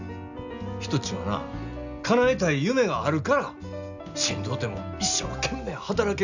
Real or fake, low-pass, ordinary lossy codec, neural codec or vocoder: real; 7.2 kHz; none; none